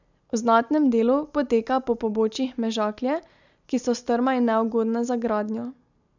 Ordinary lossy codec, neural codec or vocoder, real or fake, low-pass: none; none; real; 7.2 kHz